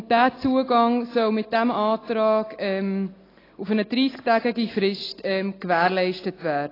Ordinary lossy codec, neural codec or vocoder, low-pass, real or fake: AAC, 24 kbps; none; 5.4 kHz; real